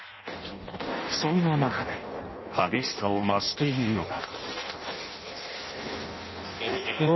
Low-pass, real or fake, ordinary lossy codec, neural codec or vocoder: 7.2 kHz; fake; MP3, 24 kbps; codec, 16 kHz in and 24 kHz out, 0.6 kbps, FireRedTTS-2 codec